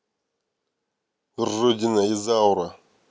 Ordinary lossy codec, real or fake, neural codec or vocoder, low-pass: none; real; none; none